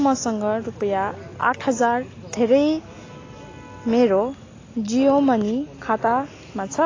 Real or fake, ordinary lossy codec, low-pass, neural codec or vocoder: real; AAC, 32 kbps; 7.2 kHz; none